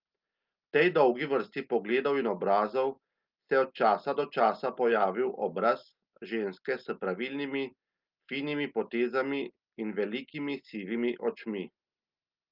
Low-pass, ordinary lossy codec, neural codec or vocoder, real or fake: 5.4 kHz; Opus, 24 kbps; none; real